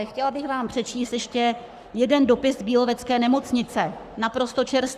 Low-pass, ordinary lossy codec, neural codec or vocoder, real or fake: 14.4 kHz; Opus, 64 kbps; codec, 44.1 kHz, 7.8 kbps, Pupu-Codec; fake